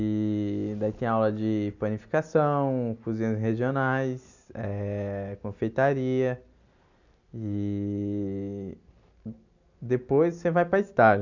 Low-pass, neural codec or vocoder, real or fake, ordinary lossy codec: 7.2 kHz; none; real; none